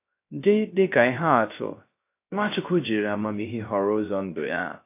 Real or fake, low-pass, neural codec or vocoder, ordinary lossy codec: fake; 3.6 kHz; codec, 16 kHz, 0.3 kbps, FocalCodec; AAC, 24 kbps